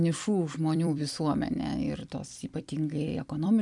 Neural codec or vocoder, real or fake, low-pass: vocoder, 44.1 kHz, 128 mel bands every 256 samples, BigVGAN v2; fake; 10.8 kHz